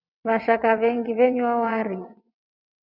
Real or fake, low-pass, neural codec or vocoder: fake; 5.4 kHz; vocoder, 22.05 kHz, 80 mel bands, WaveNeXt